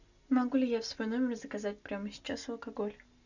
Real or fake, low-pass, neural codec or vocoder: real; 7.2 kHz; none